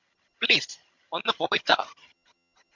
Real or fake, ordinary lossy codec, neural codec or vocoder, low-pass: fake; MP3, 64 kbps; vocoder, 22.05 kHz, 80 mel bands, HiFi-GAN; 7.2 kHz